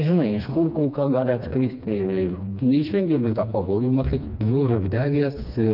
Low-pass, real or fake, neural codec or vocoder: 5.4 kHz; fake; codec, 16 kHz, 2 kbps, FreqCodec, smaller model